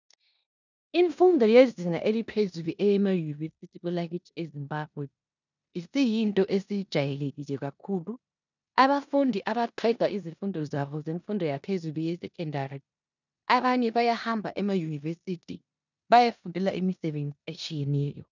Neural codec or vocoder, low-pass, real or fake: codec, 16 kHz in and 24 kHz out, 0.9 kbps, LongCat-Audio-Codec, four codebook decoder; 7.2 kHz; fake